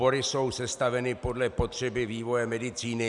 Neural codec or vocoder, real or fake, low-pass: none; real; 10.8 kHz